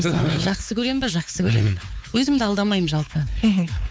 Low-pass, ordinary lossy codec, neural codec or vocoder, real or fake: none; none; codec, 16 kHz, 4 kbps, X-Codec, WavLM features, trained on Multilingual LibriSpeech; fake